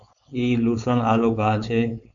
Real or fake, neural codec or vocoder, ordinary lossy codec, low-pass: fake; codec, 16 kHz, 4.8 kbps, FACodec; MP3, 96 kbps; 7.2 kHz